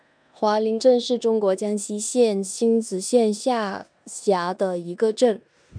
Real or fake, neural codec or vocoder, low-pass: fake; codec, 16 kHz in and 24 kHz out, 0.9 kbps, LongCat-Audio-Codec, four codebook decoder; 9.9 kHz